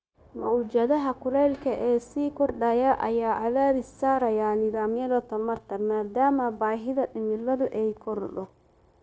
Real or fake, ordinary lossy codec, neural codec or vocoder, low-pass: fake; none; codec, 16 kHz, 0.9 kbps, LongCat-Audio-Codec; none